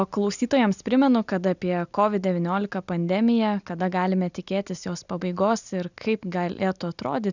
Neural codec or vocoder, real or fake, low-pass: none; real; 7.2 kHz